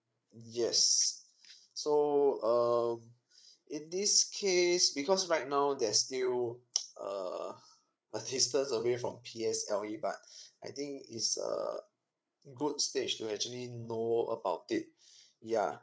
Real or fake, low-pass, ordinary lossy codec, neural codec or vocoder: fake; none; none; codec, 16 kHz, 8 kbps, FreqCodec, larger model